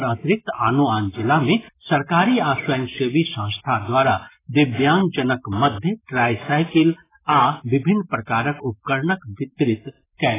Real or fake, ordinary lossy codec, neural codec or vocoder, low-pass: real; AAC, 16 kbps; none; 3.6 kHz